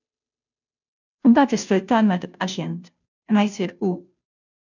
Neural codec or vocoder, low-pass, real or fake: codec, 16 kHz, 0.5 kbps, FunCodec, trained on Chinese and English, 25 frames a second; 7.2 kHz; fake